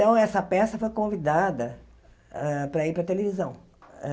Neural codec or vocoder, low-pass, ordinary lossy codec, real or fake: none; none; none; real